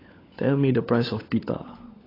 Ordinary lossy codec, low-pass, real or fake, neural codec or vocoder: AAC, 24 kbps; 5.4 kHz; fake; codec, 16 kHz, 16 kbps, FunCodec, trained on LibriTTS, 50 frames a second